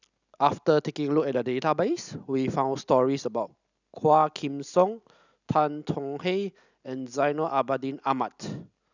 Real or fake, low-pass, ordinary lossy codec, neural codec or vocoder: real; 7.2 kHz; none; none